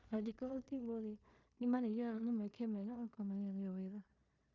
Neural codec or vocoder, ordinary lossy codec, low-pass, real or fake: codec, 16 kHz in and 24 kHz out, 0.4 kbps, LongCat-Audio-Codec, two codebook decoder; Opus, 64 kbps; 7.2 kHz; fake